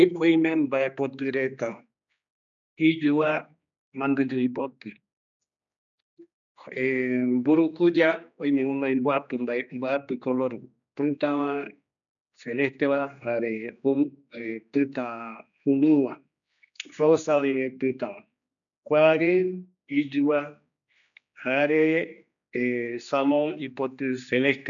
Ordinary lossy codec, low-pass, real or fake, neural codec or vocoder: none; 7.2 kHz; fake; codec, 16 kHz, 2 kbps, X-Codec, HuBERT features, trained on general audio